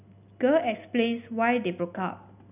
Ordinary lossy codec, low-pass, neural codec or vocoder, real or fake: none; 3.6 kHz; none; real